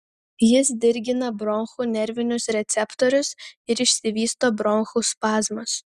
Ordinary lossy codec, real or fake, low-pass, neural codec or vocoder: Opus, 64 kbps; real; 14.4 kHz; none